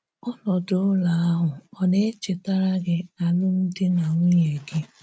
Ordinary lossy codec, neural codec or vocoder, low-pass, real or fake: none; none; none; real